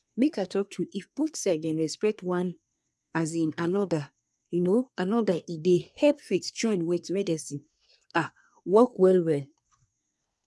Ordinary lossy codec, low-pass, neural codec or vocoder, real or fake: none; none; codec, 24 kHz, 1 kbps, SNAC; fake